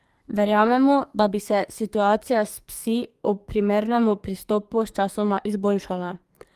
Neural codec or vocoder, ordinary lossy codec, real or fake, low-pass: codec, 44.1 kHz, 2.6 kbps, SNAC; Opus, 32 kbps; fake; 14.4 kHz